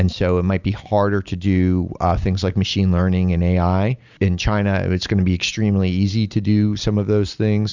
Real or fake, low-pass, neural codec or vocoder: real; 7.2 kHz; none